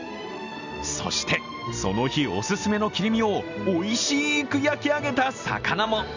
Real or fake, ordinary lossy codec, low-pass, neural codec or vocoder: real; none; 7.2 kHz; none